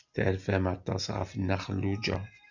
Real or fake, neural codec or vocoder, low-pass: real; none; 7.2 kHz